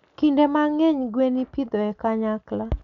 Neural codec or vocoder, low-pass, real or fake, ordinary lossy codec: none; 7.2 kHz; real; none